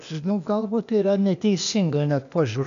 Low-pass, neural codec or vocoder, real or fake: 7.2 kHz; codec, 16 kHz, 0.8 kbps, ZipCodec; fake